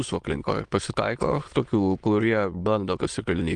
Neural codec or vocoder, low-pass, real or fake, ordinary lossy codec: autoencoder, 22.05 kHz, a latent of 192 numbers a frame, VITS, trained on many speakers; 9.9 kHz; fake; Opus, 24 kbps